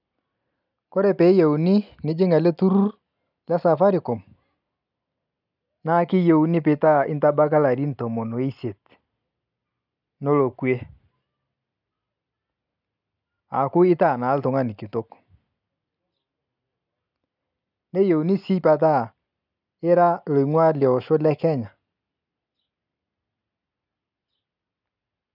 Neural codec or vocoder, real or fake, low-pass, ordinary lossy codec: none; real; 5.4 kHz; none